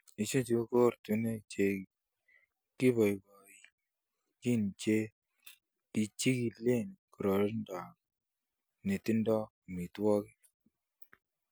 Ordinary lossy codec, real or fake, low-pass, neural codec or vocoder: none; real; none; none